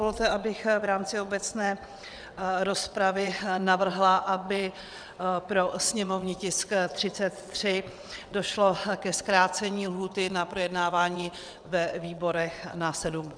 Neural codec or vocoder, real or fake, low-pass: vocoder, 22.05 kHz, 80 mel bands, WaveNeXt; fake; 9.9 kHz